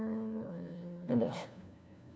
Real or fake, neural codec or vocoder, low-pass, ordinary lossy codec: fake; codec, 16 kHz, 1 kbps, FunCodec, trained on LibriTTS, 50 frames a second; none; none